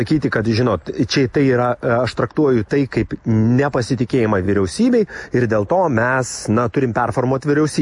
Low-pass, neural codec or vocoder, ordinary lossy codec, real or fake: 10.8 kHz; none; MP3, 48 kbps; real